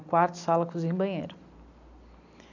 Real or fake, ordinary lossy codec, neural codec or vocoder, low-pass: real; none; none; 7.2 kHz